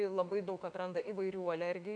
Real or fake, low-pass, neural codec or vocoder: fake; 9.9 kHz; autoencoder, 48 kHz, 32 numbers a frame, DAC-VAE, trained on Japanese speech